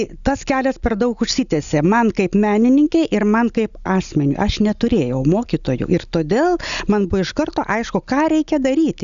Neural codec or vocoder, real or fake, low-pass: none; real; 7.2 kHz